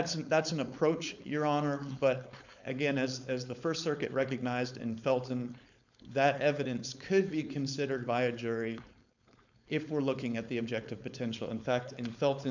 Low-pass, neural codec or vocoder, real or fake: 7.2 kHz; codec, 16 kHz, 4.8 kbps, FACodec; fake